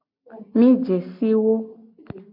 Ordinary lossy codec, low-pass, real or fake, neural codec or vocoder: MP3, 48 kbps; 5.4 kHz; real; none